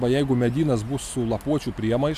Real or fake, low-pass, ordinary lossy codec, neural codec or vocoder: real; 14.4 kHz; MP3, 96 kbps; none